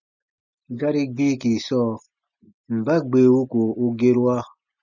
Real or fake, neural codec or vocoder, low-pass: real; none; 7.2 kHz